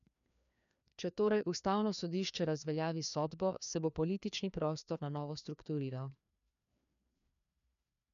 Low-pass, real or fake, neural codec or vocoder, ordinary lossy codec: 7.2 kHz; fake; codec, 16 kHz, 2 kbps, FreqCodec, larger model; none